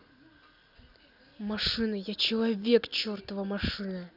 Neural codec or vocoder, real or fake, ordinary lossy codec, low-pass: none; real; none; 5.4 kHz